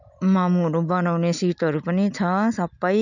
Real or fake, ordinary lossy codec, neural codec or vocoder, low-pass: real; none; none; 7.2 kHz